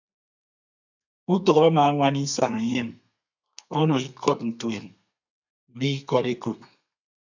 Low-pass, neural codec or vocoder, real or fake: 7.2 kHz; codec, 32 kHz, 1.9 kbps, SNAC; fake